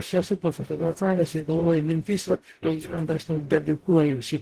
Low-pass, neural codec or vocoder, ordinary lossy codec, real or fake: 14.4 kHz; codec, 44.1 kHz, 0.9 kbps, DAC; Opus, 16 kbps; fake